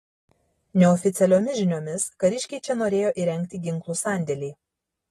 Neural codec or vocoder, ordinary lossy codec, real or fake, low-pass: none; AAC, 32 kbps; real; 19.8 kHz